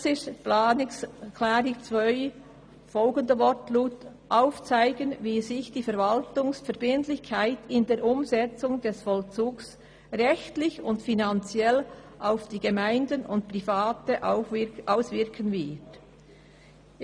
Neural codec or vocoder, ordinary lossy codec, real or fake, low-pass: none; none; real; 9.9 kHz